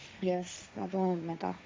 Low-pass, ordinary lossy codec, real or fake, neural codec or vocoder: none; none; fake; codec, 16 kHz, 1.1 kbps, Voila-Tokenizer